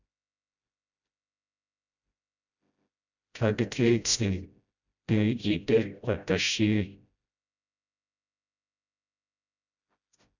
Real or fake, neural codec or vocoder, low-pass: fake; codec, 16 kHz, 0.5 kbps, FreqCodec, smaller model; 7.2 kHz